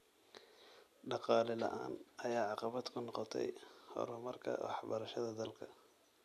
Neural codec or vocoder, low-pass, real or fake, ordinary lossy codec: none; 14.4 kHz; real; none